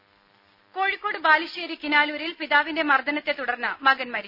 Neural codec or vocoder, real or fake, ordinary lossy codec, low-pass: none; real; none; 5.4 kHz